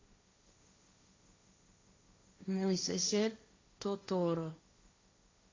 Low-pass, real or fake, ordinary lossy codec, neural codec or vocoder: 7.2 kHz; fake; AAC, 32 kbps; codec, 16 kHz, 1.1 kbps, Voila-Tokenizer